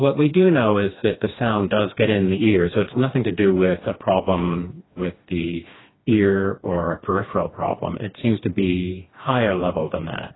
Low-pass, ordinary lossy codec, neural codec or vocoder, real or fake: 7.2 kHz; AAC, 16 kbps; codec, 16 kHz, 2 kbps, FreqCodec, smaller model; fake